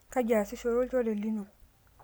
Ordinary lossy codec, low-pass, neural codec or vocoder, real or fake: none; none; none; real